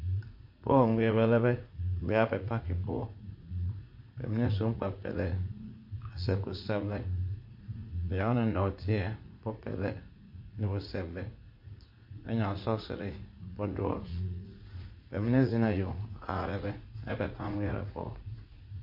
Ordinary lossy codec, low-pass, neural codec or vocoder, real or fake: MP3, 32 kbps; 5.4 kHz; vocoder, 44.1 kHz, 80 mel bands, Vocos; fake